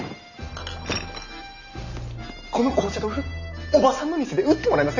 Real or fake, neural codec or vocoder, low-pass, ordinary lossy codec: real; none; 7.2 kHz; none